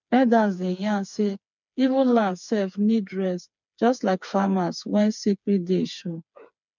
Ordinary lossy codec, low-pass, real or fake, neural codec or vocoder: none; 7.2 kHz; fake; codec, 16 kHz, 4 kbps, FreqCodec, smaller model